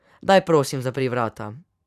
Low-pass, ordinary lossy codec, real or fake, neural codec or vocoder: 14.4 kHz; none; fake; vocoder, 44.1 kHz, 128 mel bands every 512 samples, BigVGAN v2